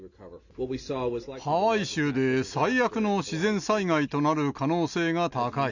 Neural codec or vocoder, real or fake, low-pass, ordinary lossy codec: none; real; 7.2 kHz; none